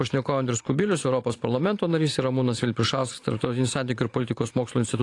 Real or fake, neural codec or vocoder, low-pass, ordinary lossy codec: fake; vocoder, 44.1 kHz, 128 mel bands every 512 samples, BigVGAN v2; 10.8 kHz; AAC, 48 kbps